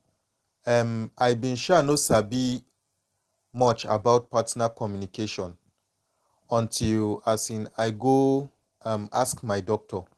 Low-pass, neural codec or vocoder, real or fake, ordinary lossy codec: 10.8 kHz; none; real; Opus, 16 kbps